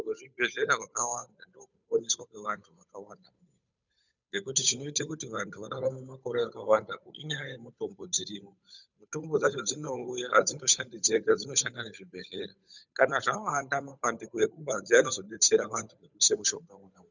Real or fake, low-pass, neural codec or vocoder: fake; 7.2 kHz; codec, 16 kHz, 8 kbps, FunCodec, trained on Chinese and English, 25 frames a second